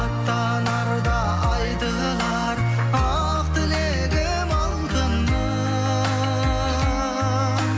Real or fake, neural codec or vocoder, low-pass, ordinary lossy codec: real; none; none; none